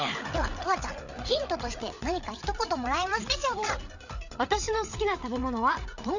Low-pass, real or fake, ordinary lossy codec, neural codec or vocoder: 7.2 kHz; fake; none; codec, 16 kHz, 8 kbps, FreqCodec, larger model